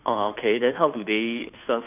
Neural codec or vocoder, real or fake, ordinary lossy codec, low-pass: codec, 16 kHz, 2 kbps, FunCodec, trained on Chinese and English, 25 frames a second; fake; none; 3.6 kHz